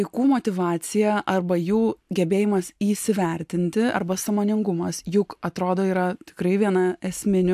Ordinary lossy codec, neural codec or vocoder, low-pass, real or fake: AAC, 96 kbps; none; 14.4 kHz; real